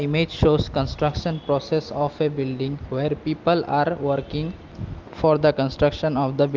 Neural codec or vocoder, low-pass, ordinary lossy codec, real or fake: none; 7.2 kHz; Opus, 32 kbps; real